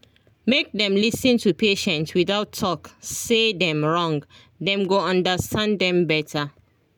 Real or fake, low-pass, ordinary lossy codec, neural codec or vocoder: real; none; none; none